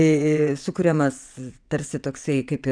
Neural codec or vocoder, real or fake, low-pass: vocoder, 22.05 kHz, 80 mel bands, WaveNeXt; fake; 9.9 kHz